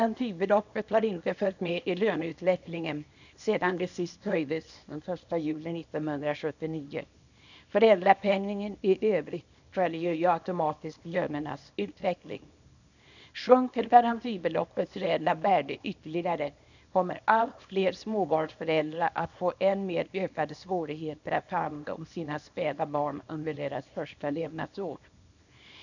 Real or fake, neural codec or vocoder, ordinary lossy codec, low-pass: fake; codec, 24 kHz, 0.9 kbps, WavTokenizer, small release; none; 7.2 kHz